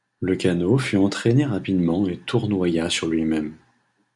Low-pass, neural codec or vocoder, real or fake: 10.8 kHz; none; real